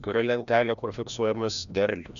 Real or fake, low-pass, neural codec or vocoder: fake; 7.2 kHz; codec, 16 kHz, 1 kbps, FreqCodec, larger model